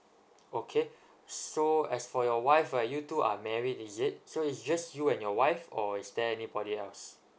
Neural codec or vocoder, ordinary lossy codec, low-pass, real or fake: none; none; none; real